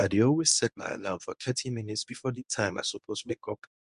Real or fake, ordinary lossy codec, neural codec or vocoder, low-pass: fake; none; codec, 24 kHz, 0.9 kbps, WavTokenizer, medium speech release version 1; 10.8 kHz